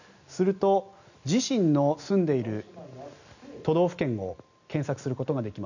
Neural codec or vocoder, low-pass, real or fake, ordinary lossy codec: none; 7.2 kHz; real; none